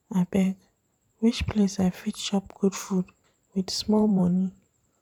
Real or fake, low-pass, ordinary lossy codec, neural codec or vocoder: fake; 19.8 kHz; none; vocoder, 44.1 kHz, 128 mel bands every 512 samples, BigVGAN v2